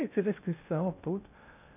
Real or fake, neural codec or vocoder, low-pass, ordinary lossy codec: fake; codec, 16 kHz in and 24 kHz out, 0.8 kbps, FocalCodec, streaming, 65536 codes; 3.6 kHz; none